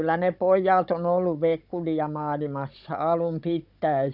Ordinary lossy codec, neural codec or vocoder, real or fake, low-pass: none; codec, 16 kHz, 16 kbps, FunCodec, trained on Chinese and English, 50 frames a second; fake; 5.4 kHz